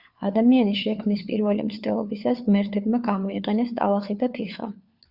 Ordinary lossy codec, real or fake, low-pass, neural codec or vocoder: Opus, 64 kbps; fake; 5.4 kHz; codec, 16 kHz, 4 kbps, FunCodec, trained on LibriTTS, 50 frames a second